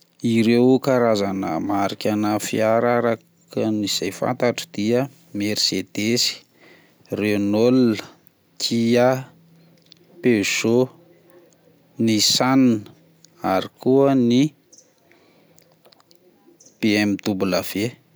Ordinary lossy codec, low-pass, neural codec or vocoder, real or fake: none; none; none; real